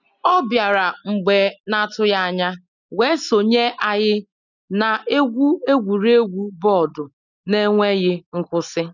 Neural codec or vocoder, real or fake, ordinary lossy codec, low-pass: none; real; none; 7.2 kHz